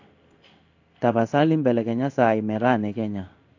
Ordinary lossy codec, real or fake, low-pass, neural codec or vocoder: AAC, 48 kbps; fake; 7.2 kHz; codec, 16 kHz in and 24 kHz out, 1 kbps, XY-Tokenizer